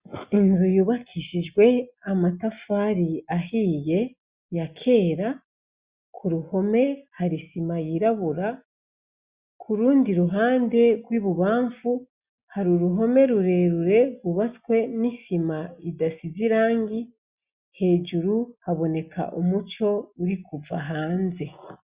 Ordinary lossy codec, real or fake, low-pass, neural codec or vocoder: Opus, 64 kbps; real; 3.6 kHz; none